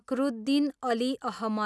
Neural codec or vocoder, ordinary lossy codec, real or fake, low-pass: none; none; real; none